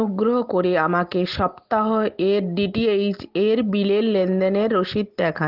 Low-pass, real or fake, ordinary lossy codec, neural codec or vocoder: 5.4 kHz; real; Opus, 16 kbps; none